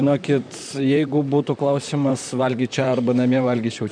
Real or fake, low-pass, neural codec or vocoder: fake; 9.9 kHz; vocoder, 44.1 kHz, 128 mel bands, Pupu-Vocoder